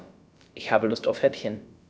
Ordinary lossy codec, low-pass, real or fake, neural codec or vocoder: none; none; fake; codec, 16 kHz, about 1 kbps, DyCAST, with the encoder's durations